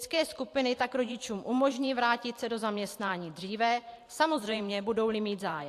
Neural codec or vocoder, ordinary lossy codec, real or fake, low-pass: vocoder, 44.1 kHz, 128 mel bands every 512 samples, BigVGAN v2; AAC, 64 kbps; fake; 14.4 kHz